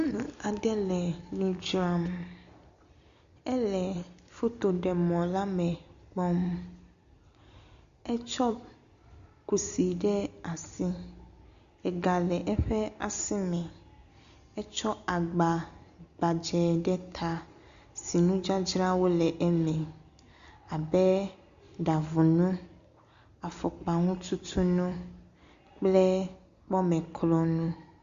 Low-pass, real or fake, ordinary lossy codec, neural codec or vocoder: 7.2 kHz; real; Opus, 64 kbps; none